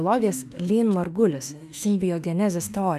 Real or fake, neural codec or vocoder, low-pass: fake; autoencoder, 48 kHz, 32 numbers a frame, DAC-VAE, trained on Japanese speech; 14.4 kHz